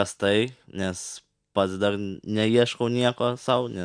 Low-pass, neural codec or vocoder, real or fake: 9.9 kHz; none; real